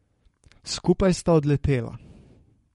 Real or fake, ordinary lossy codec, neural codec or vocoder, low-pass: fake; MP3, 48 kbps; codec, 44.1 kHz, 7.8 kbps, Pupu-Codec; 19.8 kHz